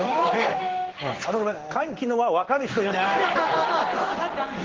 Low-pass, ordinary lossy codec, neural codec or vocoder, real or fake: 7.2 kHz; Opus, 16 kbps; codec, 16 kHz in and 24 kHz out, 1 kbps, XY-Tokenizer; fake